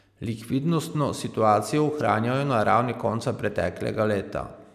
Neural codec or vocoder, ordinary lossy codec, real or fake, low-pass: none; none; real; 14.4 kHz